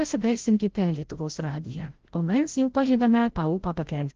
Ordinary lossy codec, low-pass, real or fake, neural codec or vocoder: Opus, 16 kbps; 7.2 kHz; fake; codec, 16 kHz, 0.5 kbps, FreqCodec, larger model